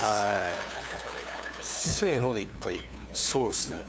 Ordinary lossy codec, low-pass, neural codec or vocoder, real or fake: none; none; codec, 16 kHz, 2 kbps, FunCodec, trained on LibriTTS, 25 frames a second; fake